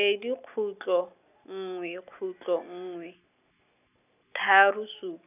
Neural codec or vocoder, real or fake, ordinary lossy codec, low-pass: none; real; none; 3.6 kHz